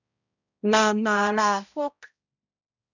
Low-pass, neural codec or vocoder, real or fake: 7.2 kHz; codec, 16 kHz, 0.5 kbps, X-Codec, HuBERT features, trained on balanced general audio; fake